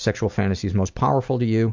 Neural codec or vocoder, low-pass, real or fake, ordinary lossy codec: none; 7.2 kHz; real; MP3, 64 kbps